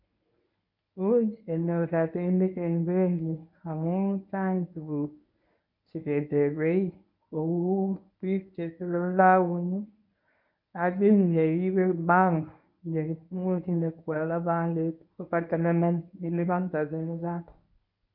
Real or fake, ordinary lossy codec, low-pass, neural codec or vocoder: fake; none; 5.4 kHz; codec, 24 kHz, 0.9 kbps, WavTokenizer, medium speech release version 1